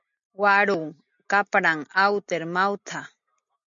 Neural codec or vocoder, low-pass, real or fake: none; 7.2 kHz; real